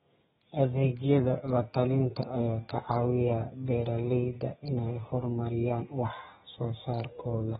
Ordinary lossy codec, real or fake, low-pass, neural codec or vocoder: AAC, 16 kbps; fake; 14.4 kHz; codec, 32 kHz, 1.9 kbps, SNAC